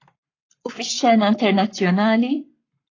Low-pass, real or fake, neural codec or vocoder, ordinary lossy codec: 7.2 kHz; fake; codec, 44.1 kHz, 7.8 kbps, Pupu-Codec; AAC, 32 kbps